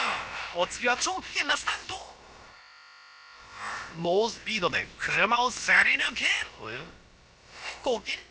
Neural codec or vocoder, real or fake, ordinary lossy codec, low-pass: codec, 16 kHz, about 1 kbps, DyCAST, with the encoder's durations; fake; none; none